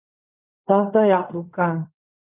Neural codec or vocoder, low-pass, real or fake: codec, 16 kHz in and 24 kHz out, 0.4 kbps, LongCat-Audio-Codec, fine tuned four codebook decoder; 3.6 kHz; fake